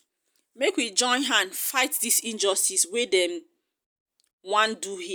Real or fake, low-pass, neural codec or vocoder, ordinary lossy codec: real; none; none; none